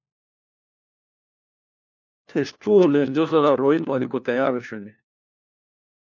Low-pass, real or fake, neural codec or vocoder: 7.2 kHz; fake; codec, 16 kHz, 1 kbps, FunCodec, trained on LibriTTS, 50 frames a second